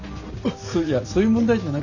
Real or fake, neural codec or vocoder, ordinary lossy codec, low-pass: real; none; none; 7.2 kHz